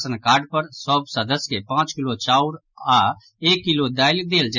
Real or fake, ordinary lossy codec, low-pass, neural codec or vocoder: real; none; 7.2 kHz; none